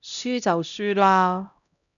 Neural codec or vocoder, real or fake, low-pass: codec, 16 kHz, 0.5 kbps, X-Codec, HuBERT features, trained on LibriSpeech; fake; 7.2 kHz